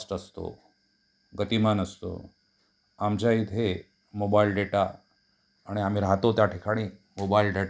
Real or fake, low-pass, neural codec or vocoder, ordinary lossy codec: real; none; none; none